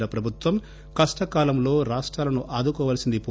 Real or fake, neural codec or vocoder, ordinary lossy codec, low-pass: real; none; none; none